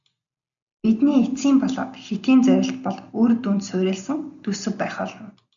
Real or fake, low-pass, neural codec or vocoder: real; 7.2 kHz; none